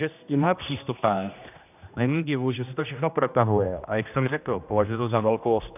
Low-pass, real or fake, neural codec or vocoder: 3.6 kHz; fake; codec, 16 kHz, 1 kbps, X-Codec, HuBERT features, trained on general audio